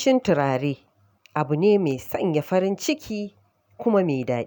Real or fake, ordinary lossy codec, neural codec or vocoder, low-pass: real; none; none; none